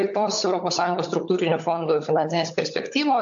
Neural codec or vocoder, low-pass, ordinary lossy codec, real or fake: codec, 16 kHz, 16 kbps, FunCodec, trained on LibriTTS, 50 frames a second; 7.2 kHz; AAC, 64 kbps; fake